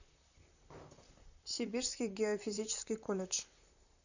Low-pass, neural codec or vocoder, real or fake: 7.2 kHz; vocoder, 44.1 kHz, 128 mel bands, Pupu-Vocoder; fake